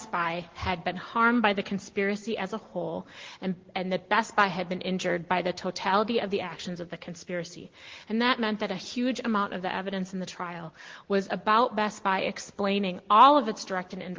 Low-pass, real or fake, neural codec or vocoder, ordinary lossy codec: 7.2 kHz; real; none; Opus, 16 kbps